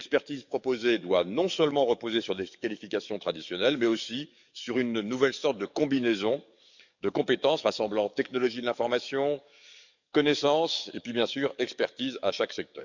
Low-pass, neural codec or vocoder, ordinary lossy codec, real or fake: 7.2 kHz; codec, 44.1 kHz, 7.8 kbps, DAC; none; fake